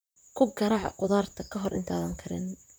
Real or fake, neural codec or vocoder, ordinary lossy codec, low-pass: fake; vocoder, 44.1 kHz, 128 mel bands, Pupu-Vocoder; none; none